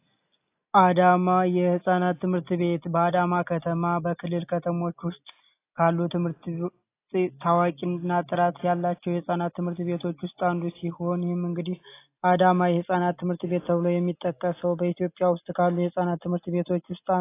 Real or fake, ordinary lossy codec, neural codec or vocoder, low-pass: real; AAC, 24 kbps; none; 3.6 kHz